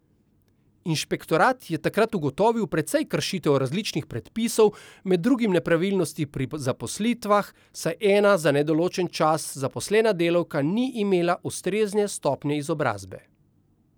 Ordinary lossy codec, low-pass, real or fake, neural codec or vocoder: none; none; real; none